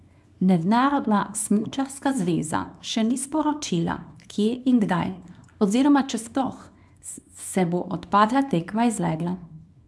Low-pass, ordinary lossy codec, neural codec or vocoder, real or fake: none; none; codec, 24 kHz, 0.9 kbps, WavTokenizer, small release; fake